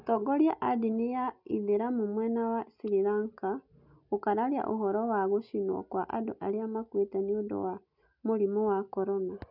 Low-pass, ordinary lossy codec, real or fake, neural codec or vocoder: 5.4 kHz; none; real; none